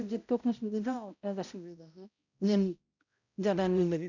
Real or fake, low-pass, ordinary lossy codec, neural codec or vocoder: fake; 7.2 kHz; none; codec, 16 kHz, 0.5 kbps, X-Codec, HuBERT features, trained on balanced general audio